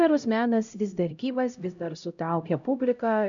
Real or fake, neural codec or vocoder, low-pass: fake; codec, 16 kHz, 0.5 kbps, X-Codec, HuBERT features, trained on LibriSpeech; 7.2 kHz